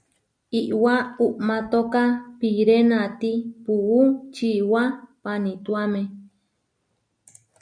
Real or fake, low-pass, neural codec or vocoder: real; 9.9 kHz; none